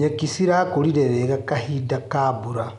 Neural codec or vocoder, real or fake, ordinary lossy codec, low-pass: none; real; none; 10.8 kHz